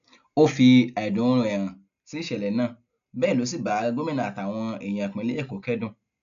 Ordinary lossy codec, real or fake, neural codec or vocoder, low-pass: AAC, 96 kbps; real; none; 7.2 kHz